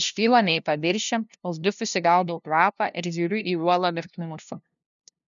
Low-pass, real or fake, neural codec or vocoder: 7.2 kHz; fake; codec, 16 kHz, 0.5 kbps, FunCodec, trained on LibriTTS, 25 frames a second